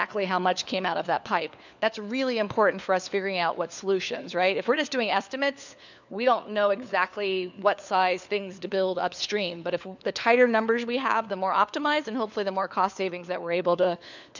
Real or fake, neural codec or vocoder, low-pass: fake; codec, 16 kHz, 4 kbps, FunCodec, trained on LibriTTS, 50 frames a second; 7.2 kHz